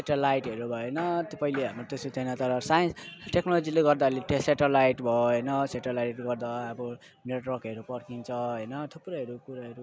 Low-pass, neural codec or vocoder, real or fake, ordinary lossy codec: none; none; real; none